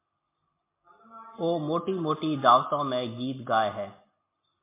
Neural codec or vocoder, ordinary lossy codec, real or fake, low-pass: none; MP3, 24 kbps; real; 3.6 kHz